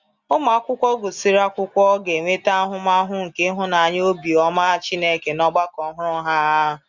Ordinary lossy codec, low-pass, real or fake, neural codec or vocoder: Opus, 64 kbps; 7.2 kHz; real; none